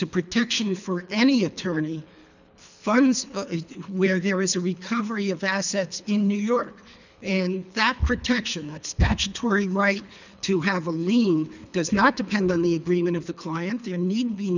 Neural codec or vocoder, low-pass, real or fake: codec, 24 kHz, 3 kbps, HILCodec; 7.2 kHz; fake